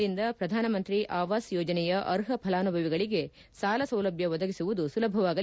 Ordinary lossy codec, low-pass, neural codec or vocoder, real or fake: none; none; none; real